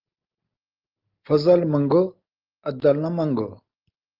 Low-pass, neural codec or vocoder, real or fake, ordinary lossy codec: 5.4 kHz; none; real; Opus, 24 kbps